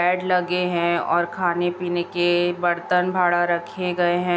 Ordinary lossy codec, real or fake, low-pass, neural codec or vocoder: none; real; none; none